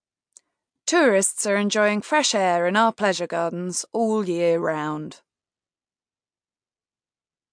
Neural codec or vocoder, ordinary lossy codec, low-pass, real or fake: none; MP3, 64 kbps; 9.9 kHz; real